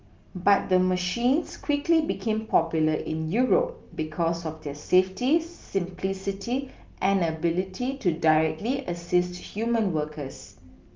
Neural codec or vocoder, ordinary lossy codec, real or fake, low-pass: none; Opus, 24 kbps; real; 7.2 kHz